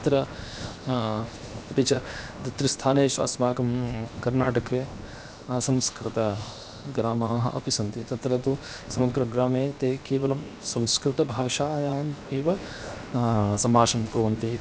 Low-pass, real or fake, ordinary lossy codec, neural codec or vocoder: none; fake; none; codec, 16 kHz, 0.7 kbps, FocalCodec